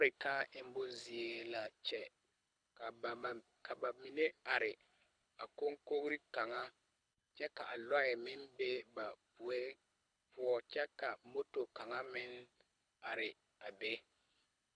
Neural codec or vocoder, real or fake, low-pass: codec, 24 kHz, 6 kbps, HILCodec; fake; 9.9 kHz